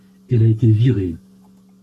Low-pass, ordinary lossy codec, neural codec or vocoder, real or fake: 14.4 kHz; AAC, 64 kbps; codec, 44.1 kHz, 7.8 kbps, Pupu-Codec; fake